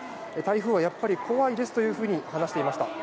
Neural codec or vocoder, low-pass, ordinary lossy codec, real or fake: none; none; none; real